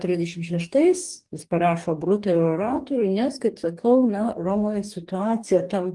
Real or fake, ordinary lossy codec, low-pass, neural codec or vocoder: fake; Opus, 24 kbps; 10.8 kHz; codec, 44.1 kHz, 2.6 kbps, DAC